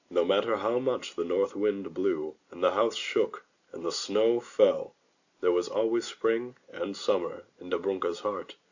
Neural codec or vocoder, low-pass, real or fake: none; 7.2 kHz; real